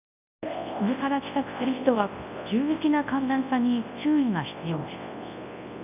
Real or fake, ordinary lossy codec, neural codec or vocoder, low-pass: fake; none; codec, 24 kHz, 0.9 kbps, WavTokenizer, large speech release; 3.6 kHz